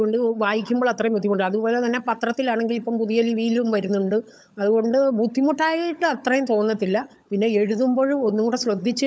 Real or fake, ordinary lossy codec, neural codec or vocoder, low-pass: fake; none; codec, 16 kHz, 16 kbps, FunCodec, trained on LibriTTS, 50 frames a second; none